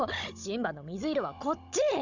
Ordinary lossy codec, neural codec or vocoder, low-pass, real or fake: none; codec, 16 kHz, 16 kbps, FunCodec, trained on Chinese and English, 50 frames a second; 7.2 kHz; fake